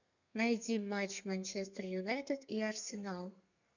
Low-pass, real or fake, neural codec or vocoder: 7.2 kHz; fake; codec, 32 kHz, 1.9 kbps, SNAC